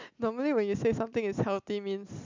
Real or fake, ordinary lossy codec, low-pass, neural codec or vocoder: real; MP3, 64 kbps; 7.2 kHz; none